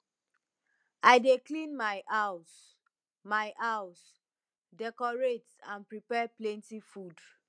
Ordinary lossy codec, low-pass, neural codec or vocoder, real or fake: none; 9.9 kHz; none; real